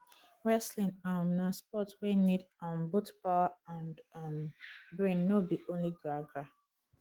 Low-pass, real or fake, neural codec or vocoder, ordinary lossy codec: 19.8 kHz; fake; autoencoder, 48 kHz, 128 numbers a frame, DAC-VAE, trained on Japanese speech; Opus, 16 kbps